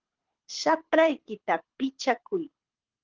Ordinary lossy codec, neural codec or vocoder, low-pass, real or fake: Opus, 16 kbps; codec, 24 kHz, 6 kbps, HILCodec; 7.2 kHz; fake